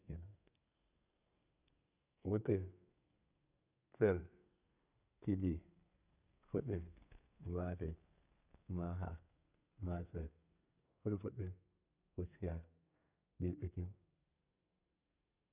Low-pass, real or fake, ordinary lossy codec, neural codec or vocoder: 3.6 kHz; fake; none; codec, 32 kHz, 1.9 kbps, SNAC